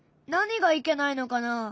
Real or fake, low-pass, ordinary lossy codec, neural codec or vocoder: real; none; none; none